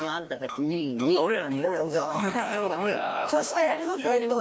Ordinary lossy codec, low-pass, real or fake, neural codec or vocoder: none; none; fake; codec, 16 kHz, 1 kbps, FreqCodec, larger model